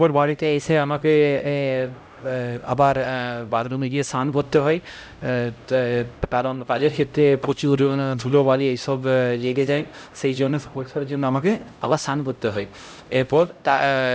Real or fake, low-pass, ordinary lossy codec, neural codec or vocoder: fake; none; none; codec, 16 kHz, 0.5 kbps, X-Codec, HuBERT features, trained on LibriSpeech